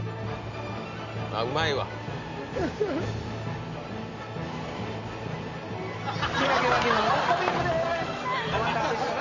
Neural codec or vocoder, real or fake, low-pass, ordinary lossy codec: none; real; 7.2 kHz; none